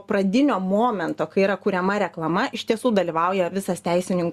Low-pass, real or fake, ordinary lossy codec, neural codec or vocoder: 14.4 kHz; real; Opus, 64 kbps; none